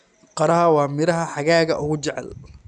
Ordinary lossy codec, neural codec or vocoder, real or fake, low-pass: none; none; real; 10.8 kHz